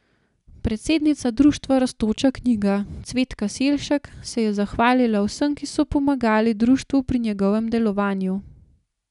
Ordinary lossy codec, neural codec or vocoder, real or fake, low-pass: none; none; real; 10.8 kHz